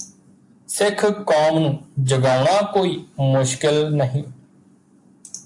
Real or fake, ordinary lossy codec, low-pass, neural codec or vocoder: real; AAC, 64 kbps; 10.8 kHz; none